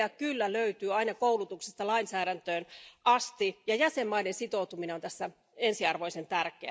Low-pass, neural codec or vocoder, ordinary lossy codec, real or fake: none; none; none; real